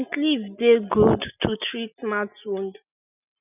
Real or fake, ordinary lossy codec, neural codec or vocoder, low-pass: real; none; none; 3.6 kHz